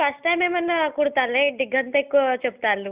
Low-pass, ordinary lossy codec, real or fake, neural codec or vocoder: 3.6 kHz; Opus, 64 kbps; real; none